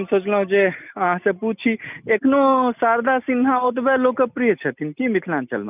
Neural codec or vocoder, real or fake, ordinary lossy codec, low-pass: none; real; none; 3.6 kHz